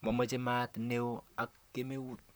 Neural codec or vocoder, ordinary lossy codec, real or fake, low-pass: vocoder, 44.1 kHz, 128 mel bands, Pupu-Vocoder; none; fake; none